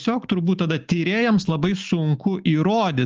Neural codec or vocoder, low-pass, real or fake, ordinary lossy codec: none; 7.2 kHz; real; Opus, 24 kbps